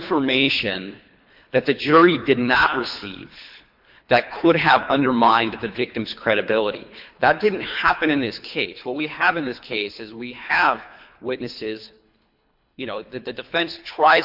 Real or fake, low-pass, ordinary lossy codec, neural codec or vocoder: fake; 5.4 kHz; MP3, 48 kbps; codec, 24 kHz, 3 kbps, HILCodec